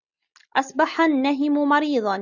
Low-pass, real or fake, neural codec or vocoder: 7.2 kHz; real; none